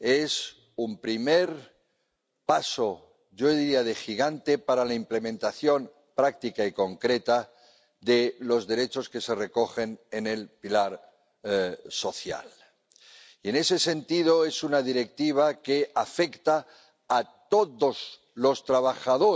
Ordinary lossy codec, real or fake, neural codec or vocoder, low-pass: none; real; none; none